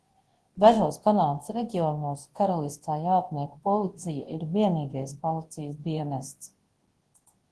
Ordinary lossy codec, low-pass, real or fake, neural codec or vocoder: Opus, 16 kbps; 10.8 kHz; fake; codec, 24 kHz, 0.9 kbps, WavTokenizer, large speech release